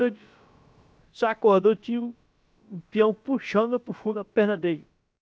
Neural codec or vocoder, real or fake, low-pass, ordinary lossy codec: codec, 16 kHz, about 1 kbps, DyCAST, with the encoder's durations; fake; none; none